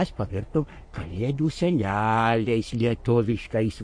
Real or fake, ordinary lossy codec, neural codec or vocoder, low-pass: fake; MP3, 48 kbps; codec, 44.1 kHz, 3.4 kbps, Pupu-Codec; 9.9 kHz